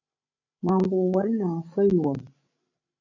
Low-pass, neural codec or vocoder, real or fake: 7.2 kHz; codec, 16 kHz, 16 kbps, FreqCodec, larger model; fake